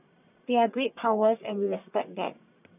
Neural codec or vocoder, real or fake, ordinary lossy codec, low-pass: codec, 44.1 kHz, 1.7 kbps, Pupu-Codec; fake; none; 3.6 kHz